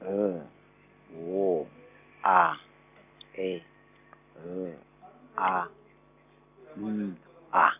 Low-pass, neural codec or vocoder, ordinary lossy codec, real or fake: 3.6 kHz; none; none; real